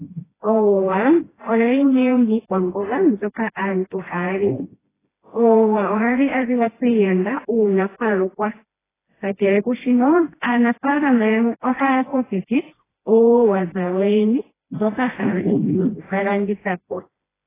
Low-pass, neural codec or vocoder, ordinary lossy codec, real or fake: 3.6 kHz; codec, 16 kHz, 1 kbps, FreqCodec, smaller model; AAC, 16 kbps; fake